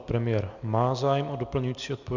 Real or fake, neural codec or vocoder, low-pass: real; none; 7.2 kHz